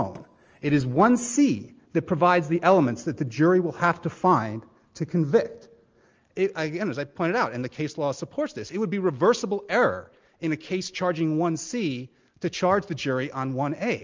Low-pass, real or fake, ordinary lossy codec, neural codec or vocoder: 7.2 kHz; real; Opus, 24 kbps; none